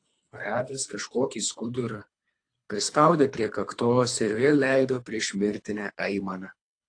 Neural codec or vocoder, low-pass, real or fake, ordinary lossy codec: codec, 24 kHz, 3 kbps, HILCodec; 9.9 kHz; fake; AAC, 48 kbps